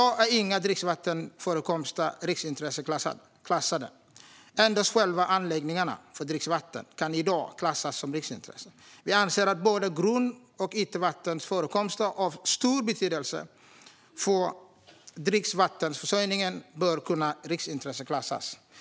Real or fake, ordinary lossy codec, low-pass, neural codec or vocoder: real; none; none; none